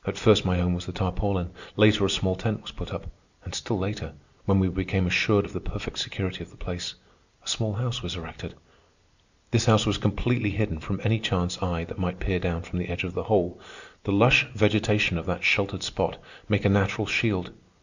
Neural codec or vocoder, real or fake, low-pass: none; real; 7.2 kHz